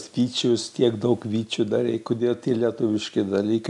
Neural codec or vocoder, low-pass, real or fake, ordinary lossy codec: none; 10.8 kHz; real; MP3, 96 kbps